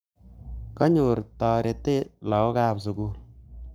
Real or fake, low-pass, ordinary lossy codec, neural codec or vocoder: fake; none; none; codec, 44.1 kHz, 7.8 kbps, Pupu-Codec